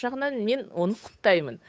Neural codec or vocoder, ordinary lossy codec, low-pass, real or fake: codec, 16 kHz, 8 kbps, FunCodec, trained on Chinese and English, 25 frames a second; none; none; fake